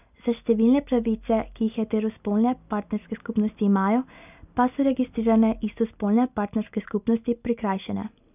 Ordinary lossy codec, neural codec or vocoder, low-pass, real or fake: none; none; 3.6 kHz; real